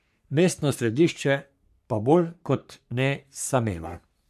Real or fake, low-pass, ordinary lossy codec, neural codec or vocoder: fake; 14.4 kHz; none; codec, 44.1 kHz, 3.4 kbps, Pupu-Codec